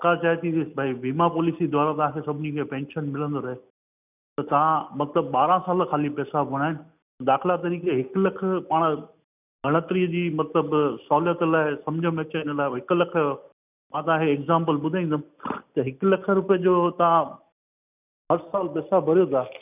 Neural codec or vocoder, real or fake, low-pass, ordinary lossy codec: none; real; 3.6 kHz; none